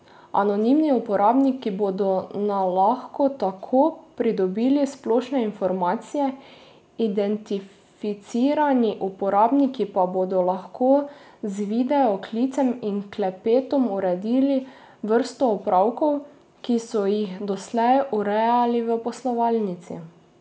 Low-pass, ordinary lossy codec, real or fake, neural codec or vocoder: none; none; real; none